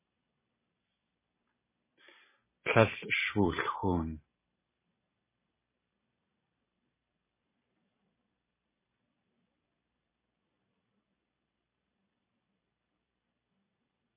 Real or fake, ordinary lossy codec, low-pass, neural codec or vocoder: fake; MP3, 16 kbps; 3.6 kHz; vocoder, 44.1 kHz, 128 mel bands every 256 samples, BigVGAN v2